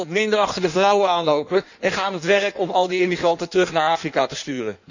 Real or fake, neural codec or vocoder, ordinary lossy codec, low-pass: fake; codec, 16 kHz in and 24 kHz out, 1.1 kbps, FireRedTTS-2 codec; none; 7.2 kHz